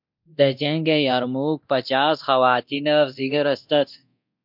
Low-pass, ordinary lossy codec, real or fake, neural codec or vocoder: 5.4 kHz; MP3, 48 kbps; fake; codec, 24 kHz, 0.9 kbps, DualCodec